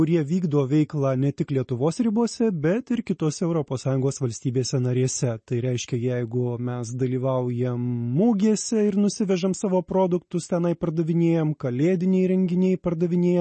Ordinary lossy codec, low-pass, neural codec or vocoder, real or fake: MP3, 32 kbps; 10.8 kHz; none; real